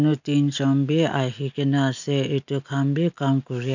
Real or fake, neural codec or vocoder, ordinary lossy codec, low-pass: real; none; none; 7.2 kHz